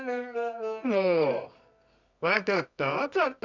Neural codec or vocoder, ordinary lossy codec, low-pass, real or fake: codec, 24 kHz, 0.9 kbps, WavTokenizer, medium music audio release; none; 7.2 kHz; fake